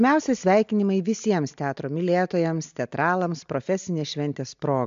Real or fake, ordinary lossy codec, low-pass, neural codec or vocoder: real; MP3, 64 kbps; 7.2 kHz; none